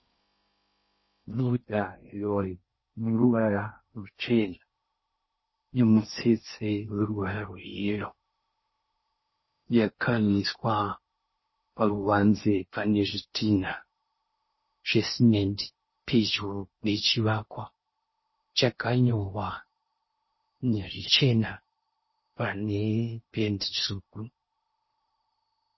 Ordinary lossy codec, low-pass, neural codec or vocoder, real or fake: MP3, 24 kbps; 7.2 kHz; codec, 16 kHz in and 24 kHz out, 0.6 kbps, FocalCodec, streaming, 4096 codes; fake